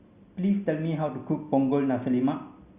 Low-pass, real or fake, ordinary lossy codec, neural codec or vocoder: 3.6 kHz; real; Opus, 64 kbps; none